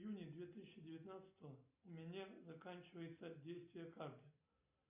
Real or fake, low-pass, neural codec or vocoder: real; 3.6 kHz; none